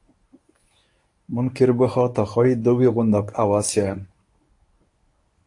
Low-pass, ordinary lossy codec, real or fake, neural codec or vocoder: 10.8 kHz; AAC, 64 kbps; fake; codec, 24 kHz, 0.9 kbps, WavTokenizer, medium speech release version 1